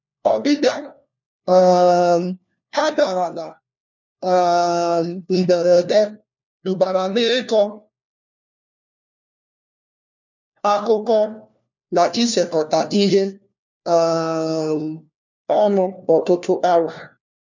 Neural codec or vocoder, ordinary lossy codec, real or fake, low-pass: codec, 16 kHz, 1 kbps, FunCodec, trained on LibriTTS, 50 frames a second; none; fake; 7.2 kHz